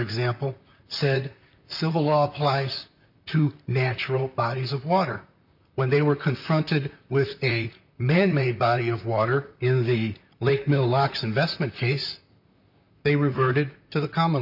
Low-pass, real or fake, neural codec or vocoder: 5.4 kHz; fake; vocoder, 44.1 kHz, 128 mel bands, Pupu-Vocoder